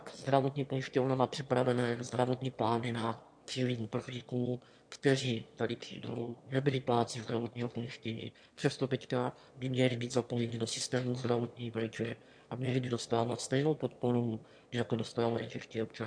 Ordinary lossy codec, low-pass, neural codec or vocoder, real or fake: MP3, 64 kbps; 9.9 kHz; autoencoder, 22.05 kHz, a latent of 192 numbers a frame, VITS, trained on one speaker; fake